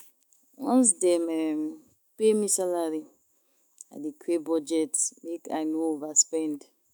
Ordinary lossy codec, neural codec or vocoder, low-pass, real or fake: none; autoencoder, 48 kHz, 128 numbers a frame, DAC-VAE, trained on Japanese speech; none; fake